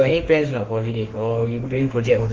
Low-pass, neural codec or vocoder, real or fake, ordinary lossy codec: 7.2 kHz; autoencoder, 48 kHz, 32 numbers a frame, DAC-VAE, trained on Japanese speech; fake; Opus, 16 kbps